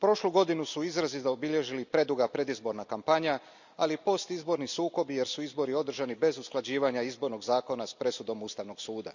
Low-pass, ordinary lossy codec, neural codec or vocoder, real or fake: 7.2 kHz; none; none; real